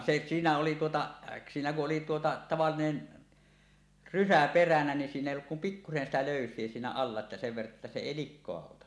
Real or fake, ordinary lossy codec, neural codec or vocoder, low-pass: real; none; none; none